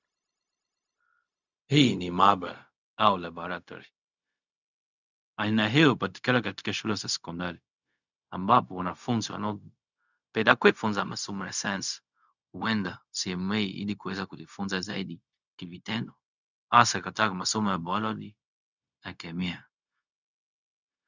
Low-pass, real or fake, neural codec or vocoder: 7.2 kHz; fake; codec, 16 kHz, 0.4 kbps, LongCat-Audio-Codec